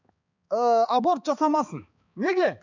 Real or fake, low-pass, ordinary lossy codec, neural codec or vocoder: fake; 7.2 kHz; none; codec, 16 kHz, 4 kbps, X-Codec, HuBERT features, trained on balanced general audio